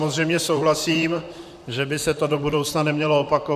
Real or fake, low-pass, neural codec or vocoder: fake; 14.4 kHz; vocoder, 44.1 kHz, 128 mel bands, Pupu-Vocoder